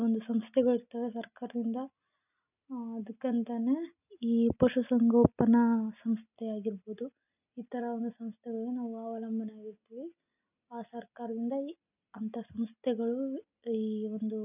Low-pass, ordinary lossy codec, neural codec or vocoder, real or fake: 3.6 kHz; none; none; real